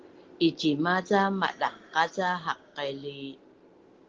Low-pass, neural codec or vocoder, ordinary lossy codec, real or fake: 7.2 kHz; none; Opus, 16 kbps; real